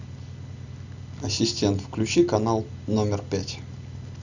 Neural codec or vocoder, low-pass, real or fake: none; 7.2 kHz; real